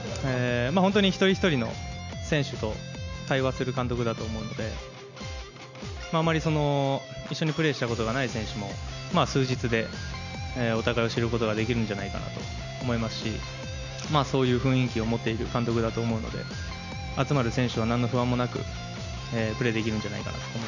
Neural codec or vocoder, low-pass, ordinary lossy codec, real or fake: none; 7.2 kHz; none; real